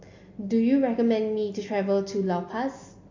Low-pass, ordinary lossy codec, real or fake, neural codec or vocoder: 7.2 kHz; AAC, 48 kbps; real; none